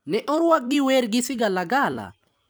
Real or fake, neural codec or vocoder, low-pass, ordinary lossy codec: fake; vocoder, 44.1 kHz, 128 mel bands every 256 samples, BigVGAN v2; none; none